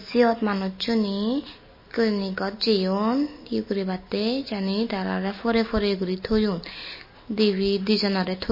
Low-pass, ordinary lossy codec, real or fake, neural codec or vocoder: 5.4 kHz; MP3, 24 kbps; real; none